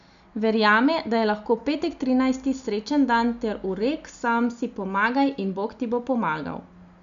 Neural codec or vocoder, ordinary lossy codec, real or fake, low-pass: none; none; real; 7.2 kHz